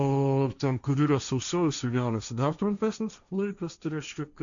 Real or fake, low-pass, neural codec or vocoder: fake; 7.2 kHz; codec, 16 kHz, 1.1 kbps, Voila-Tokenizer